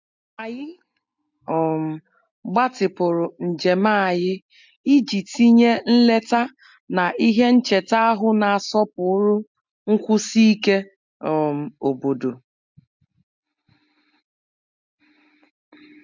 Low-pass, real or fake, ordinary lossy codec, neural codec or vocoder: 7.2 kHz; real; MP3, 64 kbps; none